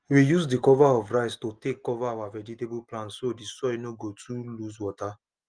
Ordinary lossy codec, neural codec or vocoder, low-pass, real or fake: Opus, 24 kbps; none; 9.9 kHz; real